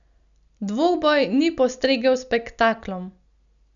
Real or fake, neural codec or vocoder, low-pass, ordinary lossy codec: real; none; 7.2 kHz; none